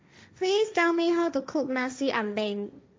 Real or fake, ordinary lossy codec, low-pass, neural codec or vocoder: fake; none; none; codec, 16 kHz, 1.1 kbps, Voila-Tokenizer